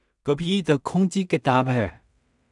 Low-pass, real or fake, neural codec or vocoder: 10.8 kHz; fake; codec, 16 kHz in and 24 kHz out, 0.4 kbps, LongCat-Audio-Codec, two codebook decoder